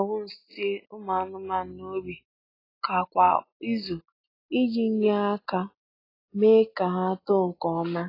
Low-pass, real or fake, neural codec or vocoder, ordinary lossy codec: 5.4 kHz; real; none; AAC, 24 kbps